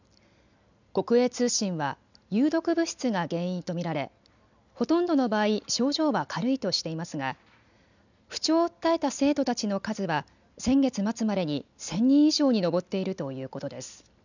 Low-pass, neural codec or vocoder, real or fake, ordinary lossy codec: 7.2 kHz; none; real; none